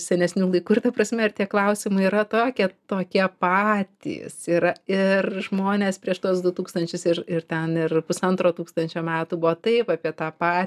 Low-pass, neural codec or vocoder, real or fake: 14.4 kHz; none; real